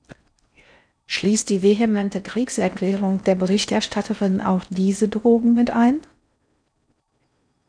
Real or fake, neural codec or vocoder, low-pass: fake; codec, 16 kHz in and 24 kHz out, 0.6 kbps, FocalCodec, streaming, 4096 codes; 9.9 kHz